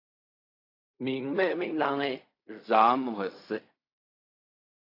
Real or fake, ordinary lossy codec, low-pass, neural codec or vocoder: fake; AAC, 32 kbps; 5.4 kHz; codec, 16 kHz in and 24 kHz out, 0.4 kbps, LongCat-Audio-Codec, fine tuned four codebook decoder